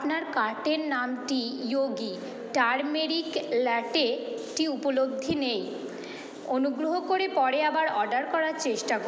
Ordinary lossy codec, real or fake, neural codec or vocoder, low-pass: none; real; none; none